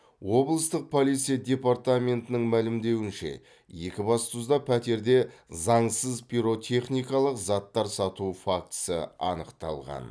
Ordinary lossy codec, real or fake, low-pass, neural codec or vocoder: none; real; none; none